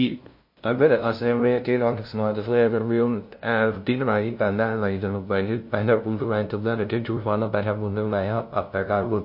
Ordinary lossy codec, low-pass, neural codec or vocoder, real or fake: MP3, 32 kbps; 5.4 kHz; codec, 16 kHz, 0.5 kbps, FunCodec, trained on LibriTTS, 25 frames a second; fake